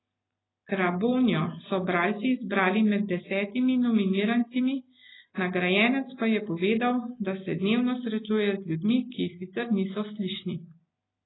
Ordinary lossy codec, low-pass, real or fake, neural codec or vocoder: AAC, 16 kbps; 7.2 kHz; real; none